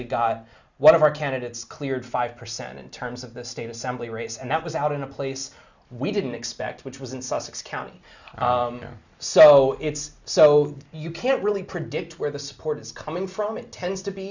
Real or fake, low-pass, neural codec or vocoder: real; 7.2 kHz; none